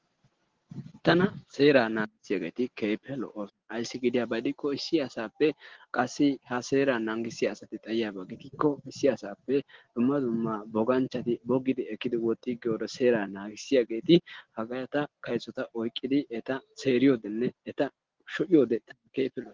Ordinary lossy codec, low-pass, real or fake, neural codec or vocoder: Opus, 16 kbps; 7.2 kHz; real; none